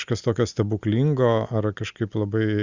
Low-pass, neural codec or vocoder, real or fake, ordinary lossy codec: 7.2 kHz; none; real; Opus, 64 kbps